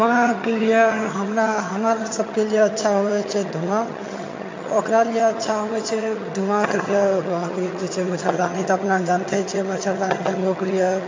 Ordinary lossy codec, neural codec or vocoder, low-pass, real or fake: MP3, 48 kbps; vocoder, 22.05 kHz, 80 mel bands, HiFi-GAN; 7.2 kHz; fake